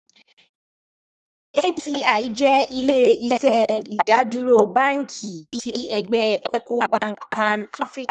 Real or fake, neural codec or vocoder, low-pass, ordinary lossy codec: fake; codec, 24 kHz, 1 kbps, SNAC; none; none